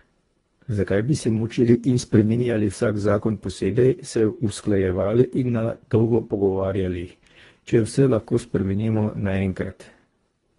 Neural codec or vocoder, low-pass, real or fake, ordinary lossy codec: codec, 24 kHz, 1.5 kbps, HILCodec; 10.8 kHz; fake; AAC, 32 kbps